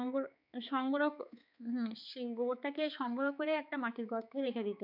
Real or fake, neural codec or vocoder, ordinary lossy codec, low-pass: fake; codec, 16 kHz, 4 kbps, X-Codec, HuBERT features, trained on balanced general audio; none; 5.4 kHz